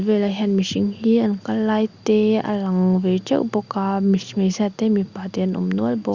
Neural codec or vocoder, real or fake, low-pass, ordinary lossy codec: none; real; 7.2 kHz; Opus, 64 kbps